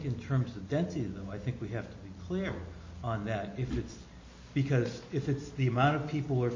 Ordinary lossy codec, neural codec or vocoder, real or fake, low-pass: MP3, 32 kbps; none; real; 7.2 kHz